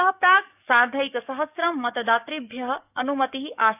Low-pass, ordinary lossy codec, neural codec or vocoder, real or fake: 3.6 kHz; none; codec, 44.1 kHz, 7.8 kbps, DAC; fake